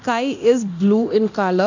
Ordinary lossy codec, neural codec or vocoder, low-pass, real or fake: none; codec, 24 kHz, 0.9 kbps, DualCodec; 7.2 kHz; fake